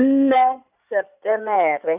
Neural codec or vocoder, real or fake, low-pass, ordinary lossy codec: codec, 16 kHz in and 24 kHz out, 2.2 kbps, FireRedTTS-2 codec; fake; 3.6 kHz; none